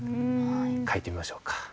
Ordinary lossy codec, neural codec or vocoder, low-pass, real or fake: none; none; none; real